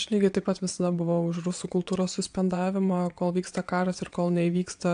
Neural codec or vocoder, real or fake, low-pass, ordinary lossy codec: vocoder, 22.05 kHz, 80 mel bands, WaveNeXt; fake; 9.9 kHz; AAC, 64 kbps